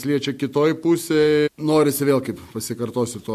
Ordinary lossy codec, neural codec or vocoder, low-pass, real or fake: MP3, 64 kbps; none; 14.4 kHz; real